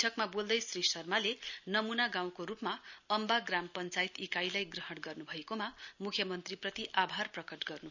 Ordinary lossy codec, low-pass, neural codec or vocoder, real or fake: none; 7.2 kHz; none; real